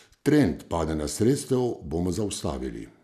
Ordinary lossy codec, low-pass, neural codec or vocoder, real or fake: none; 14.4 kHz; none; real